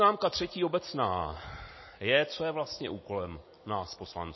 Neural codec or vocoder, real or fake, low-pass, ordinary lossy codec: none; real; 7.2 kHz; MP3, 24 kbps